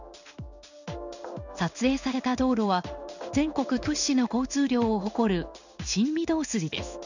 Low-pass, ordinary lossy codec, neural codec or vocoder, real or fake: 7.2 kHz; none; codec, 16 kHz in and 24 kHz out, 1 kbps, XY-Tokenizer; fake